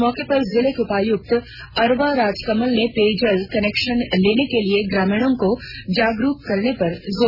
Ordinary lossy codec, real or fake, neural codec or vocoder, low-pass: none; real; none; 5.4 kHz